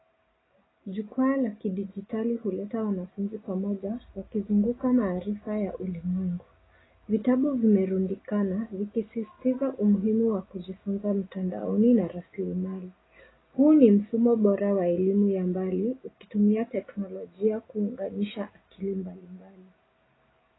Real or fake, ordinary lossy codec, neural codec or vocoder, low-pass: real; AAC, 16 kbps; none; 7.2 kHz